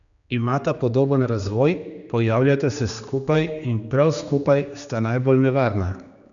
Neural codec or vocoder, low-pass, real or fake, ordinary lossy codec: codec, 16 kHz, 2 kbps, X-Codec, HuBERT features, trained on general audio; 7.2 kHz; fake; none